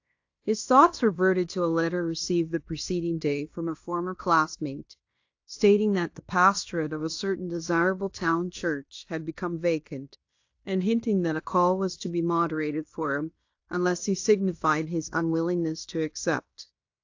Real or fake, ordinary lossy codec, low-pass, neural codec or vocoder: fake; AAC, 48 kbps; 7.2 kHz; codec, 16 kHz in and 24 kHz out, 0.9 kbps, LongCat-Audio-Codec, fine tuned four codebook decoder